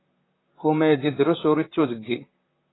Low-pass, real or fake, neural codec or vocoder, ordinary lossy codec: 7.2 kHz; fake; vocoder, 44.1 kHz, 128 mel bands, Pupu-Vocoder; AAC, 16 kbps